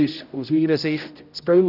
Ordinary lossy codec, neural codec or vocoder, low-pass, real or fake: none; codec, 16 kHz, 0.5 kbps, X-Codec, HuBERT features, trained on general audio; 5.4 kHz; fake